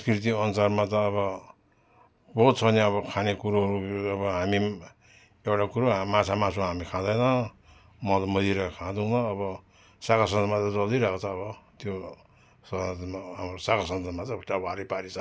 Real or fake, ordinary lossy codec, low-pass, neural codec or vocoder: real; none; none; none